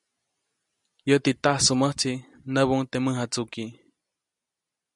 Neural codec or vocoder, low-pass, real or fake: none; 10.8 kHz; real